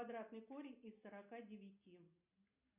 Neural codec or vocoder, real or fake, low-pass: none; real; 3.6 kHz